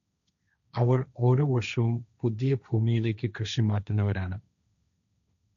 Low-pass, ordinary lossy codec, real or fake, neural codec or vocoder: 7.2 kHz; none; fake; codec, 16 kHz, 1.1 kbps, Voila-Tokenizer